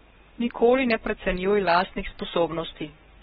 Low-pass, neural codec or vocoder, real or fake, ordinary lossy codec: 19.8 kHz; codec, 44.1 kHz, 7.8 kbps, Pupu-Codec; fake; AAC, 16 kbps